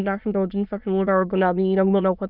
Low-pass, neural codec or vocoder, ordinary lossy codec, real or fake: 5.4 kHz; autoencoder, 22.05 kHz, a latent of 192 numbers a frame, VITS, trained on many speakers; none; fake